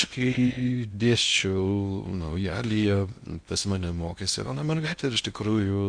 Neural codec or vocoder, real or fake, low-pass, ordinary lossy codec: codec, 16 kHz in and 24 kHz out, 0.6 kbps, FocalCodec, streaming, 2048 codes; fake; 9.9 kHz; Opus, 64 kbps